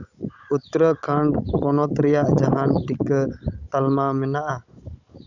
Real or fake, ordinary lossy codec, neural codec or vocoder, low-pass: fake; none; codec, 16 kHz, 16 kbps, FunCodec, trained on Chinese and English, 50 frames a second; 7.2 kHz